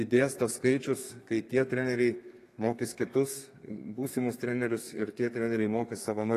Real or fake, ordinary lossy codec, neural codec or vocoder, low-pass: fake; AAC, 48 kbps; codec, 32 kHz, 1.9 kbps, SNAC; 14.4 kHz